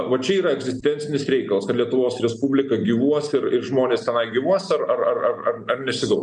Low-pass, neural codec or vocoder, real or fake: 9.9 kHz; none; real